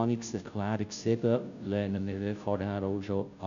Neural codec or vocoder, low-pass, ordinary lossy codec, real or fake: codec, 16 kHz, 0.5 kbps, FunCodec, trained on Chinese and English, 25 frames a second; 7.2 kHz; none; fake